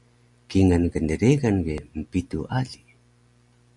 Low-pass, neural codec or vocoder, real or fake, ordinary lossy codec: 10.8 kHz; none; real; MP3, 96 kbps